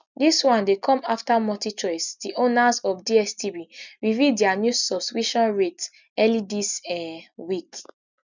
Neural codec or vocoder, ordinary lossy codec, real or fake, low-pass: none; none; real; 7.2 kHz